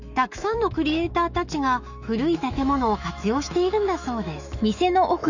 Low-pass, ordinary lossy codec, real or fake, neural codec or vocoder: 7.2 kHz; none; fake; autoencoder, 48 kHz, 128 numbers a frame, DAC-VAE, trained on Japanese speech